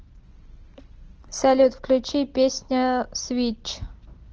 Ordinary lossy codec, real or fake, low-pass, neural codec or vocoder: Opus, 16 kbps; real; 7.2 kHz; none